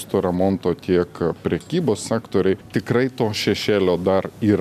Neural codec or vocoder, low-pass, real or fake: none; 14.4 kHz; real